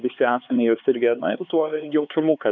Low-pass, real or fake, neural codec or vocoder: 7.2 kHz; fake; codec, 16 kHz, 4 kbps, X-Codec, HuBERT features, trained on LibriSpeech